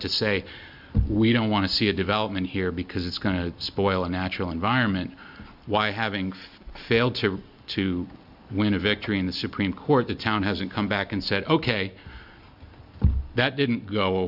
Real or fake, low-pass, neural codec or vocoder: real; 5.4 kHz; none